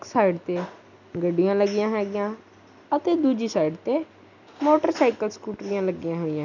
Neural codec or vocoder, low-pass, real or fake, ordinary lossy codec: none; 7.2 kHz; real; none